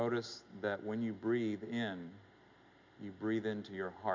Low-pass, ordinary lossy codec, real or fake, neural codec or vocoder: 7.2 kHz; MP3, 64 kbps; real; none